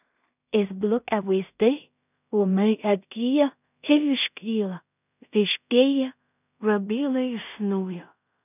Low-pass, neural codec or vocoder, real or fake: 3.6 kHz; codec, 16 kHz in and 24 kHz out, 0.4 kbps, LongCat-Audio-Codec, two codebook decoder; fake